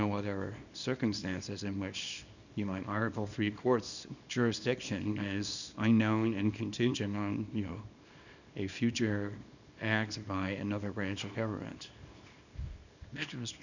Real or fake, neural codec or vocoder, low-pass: fake; codec, 24 kHz, 0.9 kbps, WavTokenizer, small release; 7.2 kHz